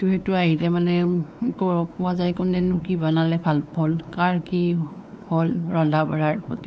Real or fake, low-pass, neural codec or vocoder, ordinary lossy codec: fake; none; codec, 16 kHz, 4 kbps, X-Codec, WavLM features, trained on Multilingual LibriSpeech; none